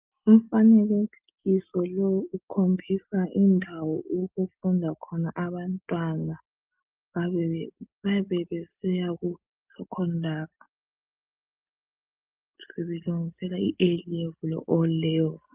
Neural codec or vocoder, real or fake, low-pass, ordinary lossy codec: none; real; 3.6 kHz; Opus, 32 kbps